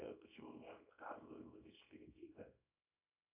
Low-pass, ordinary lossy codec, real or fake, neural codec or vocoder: 3.6 kHz; AAC, 24 kbps; fake; codec, 24 kHz, 0.9 kbps, WavTokenizer, small release